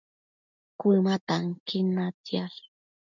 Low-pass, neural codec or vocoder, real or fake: 7.2 kHz; none; real